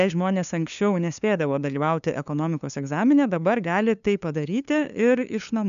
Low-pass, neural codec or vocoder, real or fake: 7.2 kHz; codec, 16 kHz, 2 kbps, FunCodec, trained on LibriTTS, 25 frames a second; fake